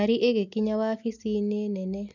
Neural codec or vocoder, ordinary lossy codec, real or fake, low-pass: none; none; real; 7.2 kHz